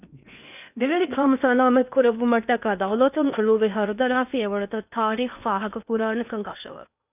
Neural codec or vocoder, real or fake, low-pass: codec, 16 kHz in and 24 kHz out, 0.8 kbps, FocalCodec, streaming, 65536 codes; fake; 3.6 kHz